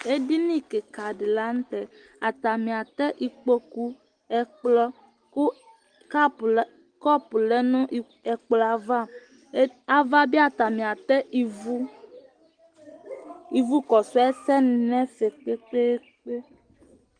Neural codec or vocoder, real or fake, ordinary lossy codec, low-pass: none; real; Opus, 24 kbps; 9.9 kHz